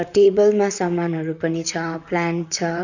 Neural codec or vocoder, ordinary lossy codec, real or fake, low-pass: vocoder, 44.1 kHz, 128 mel bands, Pupu-Vocoder; none; fake; 7.2 kHz